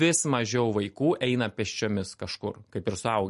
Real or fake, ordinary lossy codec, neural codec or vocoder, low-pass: real; MP3, 48 kbps; none; 14.4 kHz